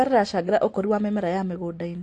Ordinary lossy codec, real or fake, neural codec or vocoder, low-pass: AAC, 48 kbps; real; none; 10.8 kHz